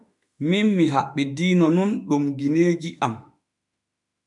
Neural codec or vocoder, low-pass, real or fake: autoencoder, 48 kHz, 32 numbers a frame, DAC-VAE, trained on Japanese speech; 10.8 kHz; fake